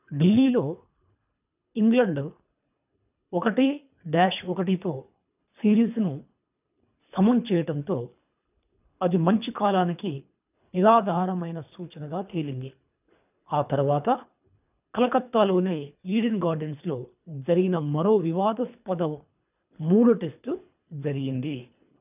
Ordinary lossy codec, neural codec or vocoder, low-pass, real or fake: none; codec, 24 kHz, 3 kbps, HILCodec; 3.6 kHz; fake